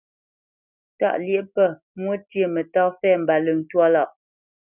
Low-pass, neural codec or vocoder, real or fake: 3.6 kHz; none; real